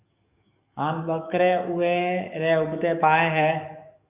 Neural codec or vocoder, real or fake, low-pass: codec, 44.1 kHz, 7.8 kbps, DAC; fake; 3.6 kHz